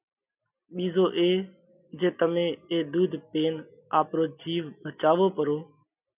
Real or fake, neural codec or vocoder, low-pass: real; none; 3.6 kHz